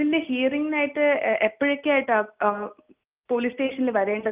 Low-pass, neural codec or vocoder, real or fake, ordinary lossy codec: 3.6 kHz; none; real; Opus, 24 kbps